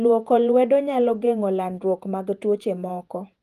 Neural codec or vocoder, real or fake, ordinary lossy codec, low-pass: vocoder, 48 kHz, 128 mel bands, Vocos; fake; Opus, 32 kbps; 14.4 kHz